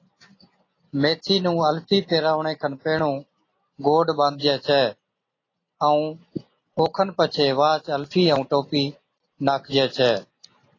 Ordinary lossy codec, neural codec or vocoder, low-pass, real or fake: AAC, 32 kbps; none; 7.2 kHz; real